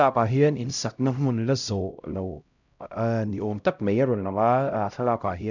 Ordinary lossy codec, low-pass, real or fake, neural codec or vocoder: none; 7.2 kHz; fake; codec, 16 kHz, 0.5 kbps, X-Codec, HuBERT features, trained on LibriSpeech